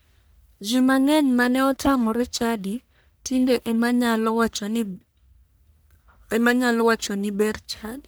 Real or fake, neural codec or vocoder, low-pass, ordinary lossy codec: fake; codec, 44.1 kHz, 1.7 kbps, Pupu-Codec; none; none